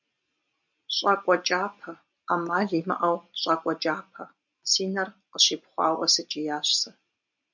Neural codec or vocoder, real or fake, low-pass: none; real; 7.2 kHz